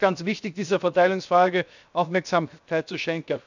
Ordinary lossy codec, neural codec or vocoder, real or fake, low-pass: none; codec, 16 kHz, 0.7 kbps, FocalCodec; fake; 7.2 kHz